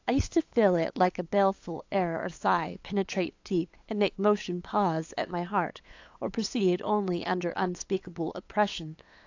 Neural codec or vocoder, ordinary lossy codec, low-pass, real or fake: codec, 16 kHz, 8 kbps, FunCodec, trained on LibriTTS, 25 frames a second; AAC, 48 kbps; 7.2 kHz; fake